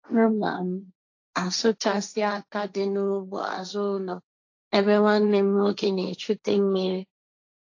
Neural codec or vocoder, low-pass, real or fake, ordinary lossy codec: codec, 16 kHz, 1.1 kbps, Voila-Tokenizer; 7.2 kHz; fake; AAC, 48 kbps